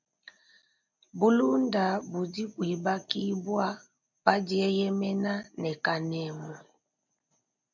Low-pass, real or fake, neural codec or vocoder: 7.2 kHz; real; none